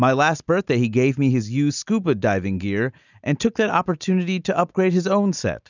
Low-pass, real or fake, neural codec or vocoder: 7.2 kHz; real; none